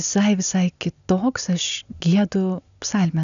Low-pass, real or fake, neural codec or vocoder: 7.2 kHz; real; none